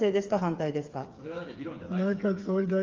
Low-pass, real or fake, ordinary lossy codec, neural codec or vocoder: 7.2 kHz; fake; Opus, 32 kbps; codec, 16 kHz, 8 kbps, FreqCodec, smaller model